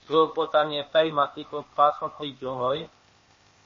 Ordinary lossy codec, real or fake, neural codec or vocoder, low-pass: MP3, 32 kbps; fake; codec, 16 kHz, 0.8 kbps, ZipCodec; 7.2 kHz